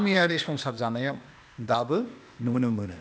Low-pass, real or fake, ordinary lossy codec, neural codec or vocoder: none; fake; none; codec, 16 kHz, 0.8 kbps, ZipCodec